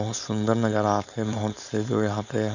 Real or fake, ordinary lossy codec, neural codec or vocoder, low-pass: fake; none; codec, 16 kHz, 4.8 kbps, FACodec; 7.2 kHz